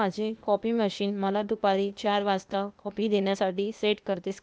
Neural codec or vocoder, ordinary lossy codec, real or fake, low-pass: codec, 16 kHz, 0.8 kbps, ZipCodec; none; fake; none